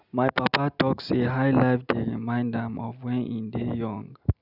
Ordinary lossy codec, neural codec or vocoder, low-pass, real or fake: none; none; 5.4 kHz; real